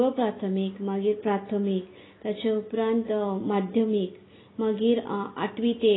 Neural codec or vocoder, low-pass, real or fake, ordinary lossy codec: none; 7.2 kHz; real; AAC, 16 kbps